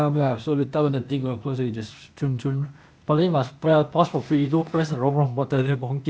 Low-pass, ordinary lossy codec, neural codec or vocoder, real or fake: none; none; codec, 16 kHz, 0.8 kbps, ZipCodec; fake